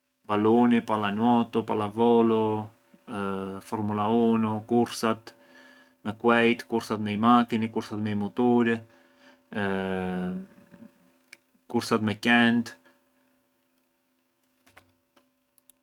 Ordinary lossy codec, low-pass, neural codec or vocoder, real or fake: none; 19.8 kHz; codec, 44.1 kHz, 7.8 kbps, DAC; fake